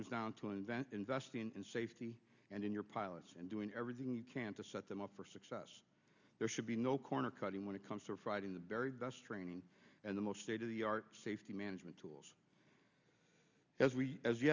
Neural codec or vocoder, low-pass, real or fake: none; 7.2 kHz; real